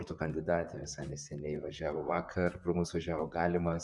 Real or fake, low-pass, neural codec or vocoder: fake; 10.8 kHz; vocoder, 44.1 kHz, 128 mel bands, Pupu-Vocoder